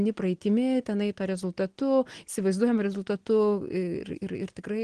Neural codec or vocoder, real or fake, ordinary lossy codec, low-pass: none; real; Opus, 16 kbps; 9.9 kHz